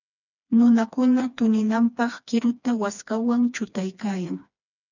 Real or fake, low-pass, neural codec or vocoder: fake; 7.2 kHz; codec, 16 kHz, 2 kbps, FreqCodec, smaller model